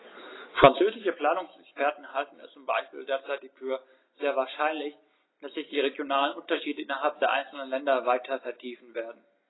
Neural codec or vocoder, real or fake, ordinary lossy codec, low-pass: none; real; AAC, 16 kbps; 7.2 kHz